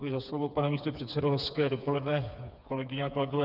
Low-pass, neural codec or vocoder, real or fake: 5.4 kHz; codec, 16 kHz, 4 kbps, FreqCodec, smaller model; fake